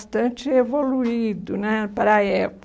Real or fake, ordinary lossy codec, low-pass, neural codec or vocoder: real; none; none; none